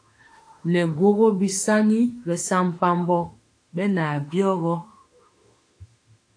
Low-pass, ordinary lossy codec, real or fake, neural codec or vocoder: 9.9 kHz; AAC, 48 kbps; fake; autoencoder, 48 kHz, 32 numbers a frame, DAC-VAE, trained on Japanese speech